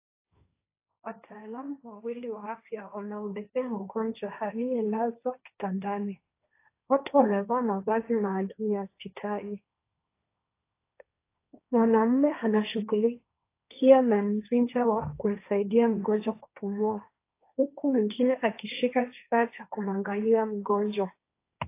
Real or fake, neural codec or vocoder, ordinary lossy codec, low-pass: fake; codec, 16 kHz, 1.1 kbps, Voila-Tokenizer; AAC, 24 kbps; 3.6 kHz